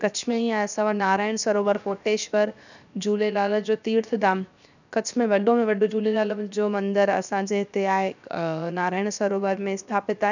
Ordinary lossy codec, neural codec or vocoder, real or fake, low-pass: none; codec, 16 kHz, 0.7 kbps, FocalCodec; fake; 7.2 kHz